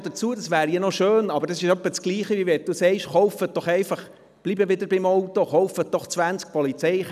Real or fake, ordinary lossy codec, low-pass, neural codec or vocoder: real; none; 14.4 kHz; none